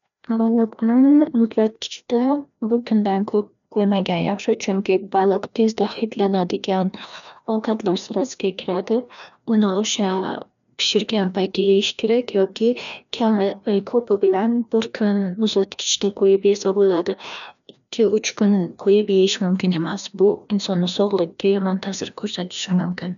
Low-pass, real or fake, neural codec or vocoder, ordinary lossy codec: 7.2 kHz; fake; codec, 16 kHz, 1 kbps, FreqCodec, larger model; none